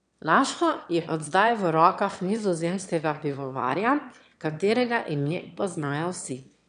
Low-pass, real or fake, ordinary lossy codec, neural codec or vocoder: 9.9 kHz; fake; none; autoencoder, 22.05 kHz, a latent of 192 numbers a frame, VITS, trained on one speaker